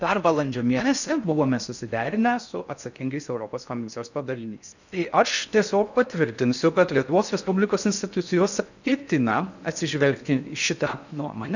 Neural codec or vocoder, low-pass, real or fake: codec, 16 kHz in and 24 kHz out, 0.6 kbps, FocalCodec, streaming, 4096 codes; 7.2 kHz; fake